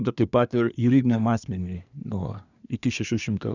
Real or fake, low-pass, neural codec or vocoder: fake; 7.2 kHz; codec, 24 kHz, 1 kbps, SNAC